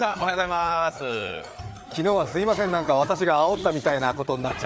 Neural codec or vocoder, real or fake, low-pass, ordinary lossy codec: codec, 16 kHz, 4 kbps, FreqCodec, larger model; fake; none; none